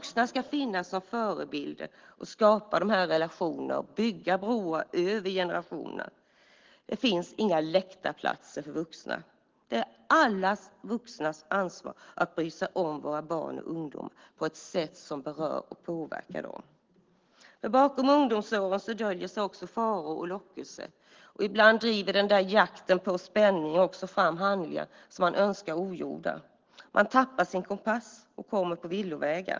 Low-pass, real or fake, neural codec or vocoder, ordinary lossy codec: 7.2 kHz; real; none; Opus, 16 kbps